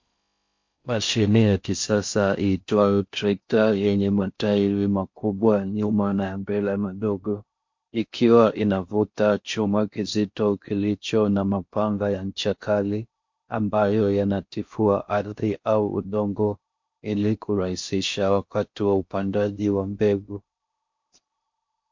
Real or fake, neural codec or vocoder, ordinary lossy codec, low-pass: fake; codec, 16 kHz in and 24 kHz out, 0.6 kbps, FocalCodec, streaming, 4096 codes; MP3, 48 kbps; 7.2 kHz